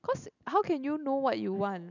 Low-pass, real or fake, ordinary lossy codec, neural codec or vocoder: 7.2 kHz; real; none; none